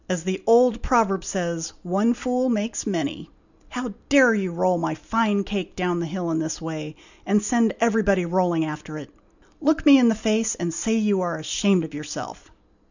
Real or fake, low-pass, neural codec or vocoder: real; 7.2 kHz; none